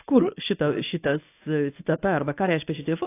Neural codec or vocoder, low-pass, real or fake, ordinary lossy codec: codec, 24 kHz, 0.9 kbps, WavTokenizer, medium speech release version 1; 3.6 kHz; fake; AAC, 24 kbps